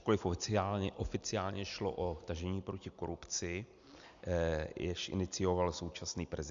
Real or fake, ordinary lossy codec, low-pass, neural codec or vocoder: real; MP3, 64 kbps; 7.2 kHz; none